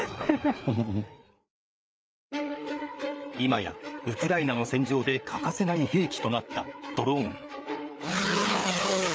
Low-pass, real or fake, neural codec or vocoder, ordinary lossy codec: none; fake; codec, 16 kHz, 4 kbps, FreqCodec, larger model; none